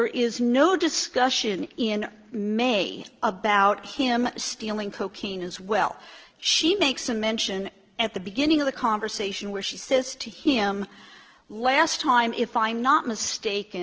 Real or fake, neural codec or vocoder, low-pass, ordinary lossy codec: real; none; 7.2 kHz; Opus, 16 kbps